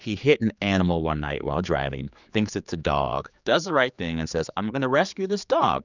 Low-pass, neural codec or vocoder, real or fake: 7.2 kHz; codec, 16 kHz, 4 kbps, X-Codec, HuBERT features, trained on general audio; fake